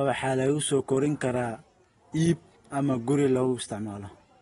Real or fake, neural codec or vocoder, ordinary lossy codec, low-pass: real; none; AAC, 32 kbps; 10.8 kHz